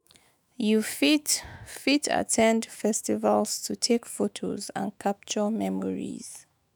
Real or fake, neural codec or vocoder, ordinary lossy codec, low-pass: fake; autoencoder, 48 kHz, 128 numbers a frame, DAC-VAE, trained on Japanese speech; none; none